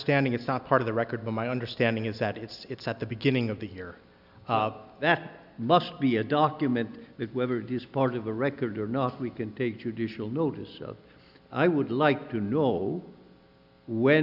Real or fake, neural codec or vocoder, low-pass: real; none; 5.4 kHz